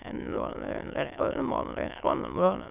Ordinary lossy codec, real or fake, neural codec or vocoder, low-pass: none; fake; autoencoder, 22.05 kHz, a latent of 192 numbers a frame, VITS, trained on many speakers; 3.6 kHz